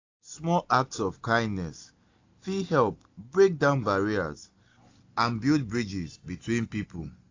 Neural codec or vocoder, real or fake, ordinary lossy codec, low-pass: none; real; AAC, 48 kbps; 7.2 kHz